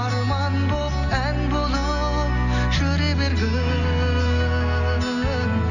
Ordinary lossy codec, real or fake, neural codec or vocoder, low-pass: none; real; none; 7.2 kHz